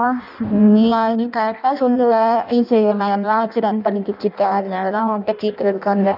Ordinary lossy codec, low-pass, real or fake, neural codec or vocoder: none; 5.4 kHz; fake; codec, 16 kHz in and 24 kHz out, 0.6 kbps, FireRedTTS-2 codec